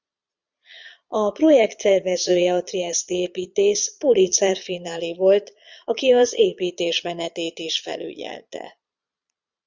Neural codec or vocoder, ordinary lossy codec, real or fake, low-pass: vocoder, 22.05 kHz, 80 mel bands, Vocos; Opus, 64 kbps; fake; 7.2 kHz